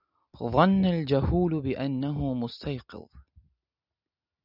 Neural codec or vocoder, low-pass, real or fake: none; 5.4 kHz; real